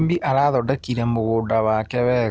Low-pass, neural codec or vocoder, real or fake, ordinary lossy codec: none; none; real; none